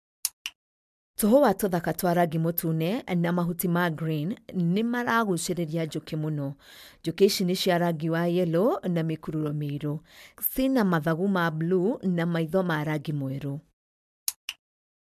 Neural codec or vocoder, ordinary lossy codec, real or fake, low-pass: none; MP3, 96 kbps; real; 14.4 kHz